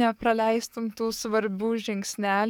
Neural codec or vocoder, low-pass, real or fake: codec, 44.1 kHz, 7.8 kbps, DAC; 19.8 kHz; fake